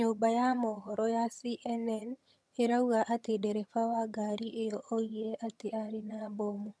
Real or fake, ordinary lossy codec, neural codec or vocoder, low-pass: fake; none; vocoder, 22.05 kHz, 80 mel bands, HiFi-GAN; none